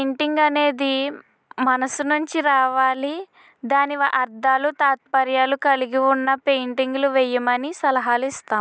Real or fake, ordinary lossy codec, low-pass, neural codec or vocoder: real; none; none; none